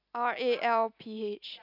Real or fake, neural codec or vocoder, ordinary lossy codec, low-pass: real; none; none; 5.4 kHz